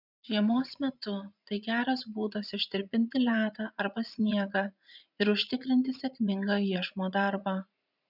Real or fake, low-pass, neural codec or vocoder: fake; 5.4 kHz; vocoder, 44.1 kHz, 80 mel bands, Vocos